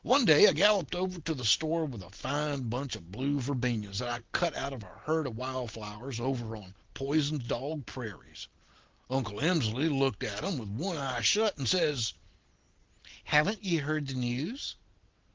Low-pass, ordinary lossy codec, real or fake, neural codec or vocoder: 7.2 kHz; Opus, 16 kbps; real; none